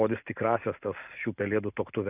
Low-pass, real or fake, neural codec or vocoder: 3.6 kHz; real; none